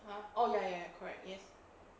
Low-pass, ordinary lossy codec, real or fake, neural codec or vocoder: none; none; real; none